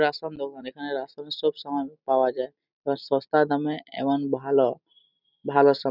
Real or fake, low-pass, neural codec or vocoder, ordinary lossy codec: real; 5.4 kHz; none; none